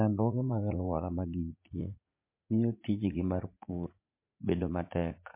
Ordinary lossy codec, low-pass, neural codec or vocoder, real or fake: MP3, 24 kbps; 3.6 kHz; vocoder, 44.1 kHz, 80 mel bands, Vocos; fake